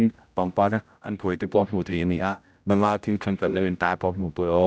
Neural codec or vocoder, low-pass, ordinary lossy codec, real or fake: codec, 16 kHz, 0.5 kbps, X-Codec, HuBERT features, trained on general audio; none; none; fake